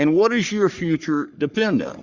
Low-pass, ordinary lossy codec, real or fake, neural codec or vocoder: 7.2 kHz; Opus, 64 kbps; fake; codec, 44.1 kHz, 3.4 kbps, Pupu-Codec